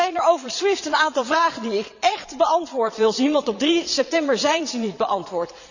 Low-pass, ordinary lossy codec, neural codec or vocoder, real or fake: 7.2 kHz; none; vocoder, 22.05 kHz, 80 mel bands, Vocos; fake